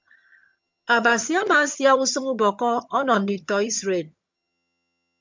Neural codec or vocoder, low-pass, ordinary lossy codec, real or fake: vocoder, 22.05 kHz, 80 mel bands, HiFi-GAN; 7.2 kHz; MP3, 64 kbps; fake